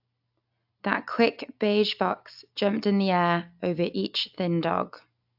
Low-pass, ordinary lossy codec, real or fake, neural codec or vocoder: 5.4 kHz; AAC, 48 kbps; real; none